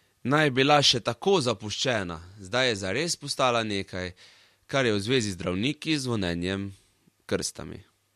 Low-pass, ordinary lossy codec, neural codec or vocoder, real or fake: 14.4 kHz; MP3, 64 kbps; none; real